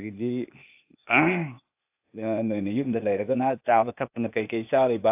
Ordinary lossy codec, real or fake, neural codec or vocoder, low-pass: AAC, 32 kbps; fake; codec, 16 kHz, 0.8 kbps, ZipCodec; 3.6 kHz